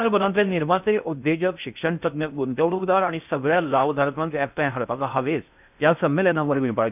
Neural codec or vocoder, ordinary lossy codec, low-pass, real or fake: codec, 16 kHz in and 24 kHz out, 0.6 kbps, FocalCodec, streaming, 2048 codes; none; 3.6 kHz; fake